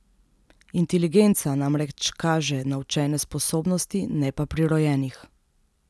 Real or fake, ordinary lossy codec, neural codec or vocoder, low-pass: real; none; none; none